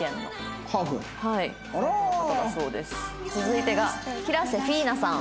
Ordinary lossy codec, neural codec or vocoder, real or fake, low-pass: none; none; real; none